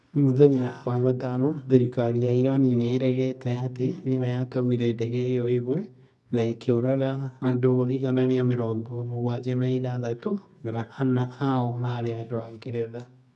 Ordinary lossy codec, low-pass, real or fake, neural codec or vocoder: none; none; fake; codec, 24 kHz, 0.9 kbps, WavTokenizer, medium music audio release